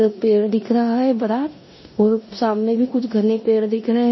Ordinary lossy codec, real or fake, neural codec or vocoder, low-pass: MP3, 24 kbps; fake; codec, 16 kHz in and 24 kHz out, 0.9 kbps, LongCat-Audio-Codec, four codebook decoder; 7.2 kHz